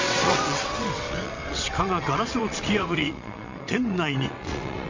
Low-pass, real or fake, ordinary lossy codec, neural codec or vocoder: 7.2 kHz; fake; MP3, 48 kbps; vocoder, 22.05 kHz, 80 mel bands, WaveNeXt